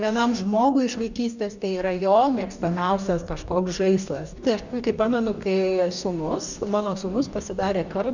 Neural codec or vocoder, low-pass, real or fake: codec, 44.1 kHz, 2.6 kbps, DAC; 7.2 kHz; fake